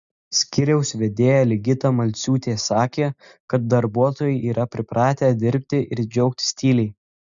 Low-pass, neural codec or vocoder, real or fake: 7.2 kHz; none; real